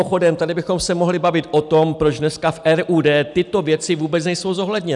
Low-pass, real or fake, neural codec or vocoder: 10.8 kHz; real; none